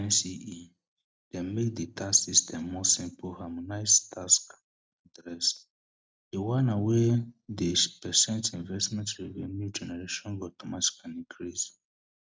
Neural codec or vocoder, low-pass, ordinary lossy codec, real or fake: none; none; none; real